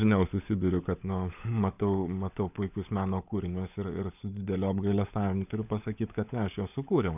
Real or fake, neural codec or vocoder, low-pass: fake; codec, 16 kHz, 16 kbps, FunCodec, trained on LibriTTS, 50 frames a second; 3.6 kHz